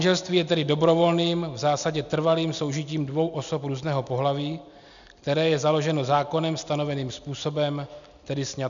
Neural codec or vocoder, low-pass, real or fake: none; 7.2 kHz; real